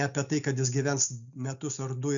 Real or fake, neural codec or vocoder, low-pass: real; none; 7.2 kHz